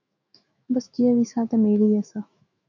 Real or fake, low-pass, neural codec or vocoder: fake; 7.2 kHz; autoencoder, 48 kHz, 128 numbers a frame, DAC-VAE, trained on Japanese speech